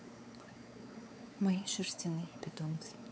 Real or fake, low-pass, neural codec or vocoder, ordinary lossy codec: fake; none; codec, 16 kHz, 4 kbps, X-Codec, WavLM features, trained on Multilingual LibriSpeech; none